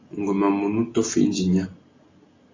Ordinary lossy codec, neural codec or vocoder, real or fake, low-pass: AAC, 32 kbps; none; real; 7.2 kHz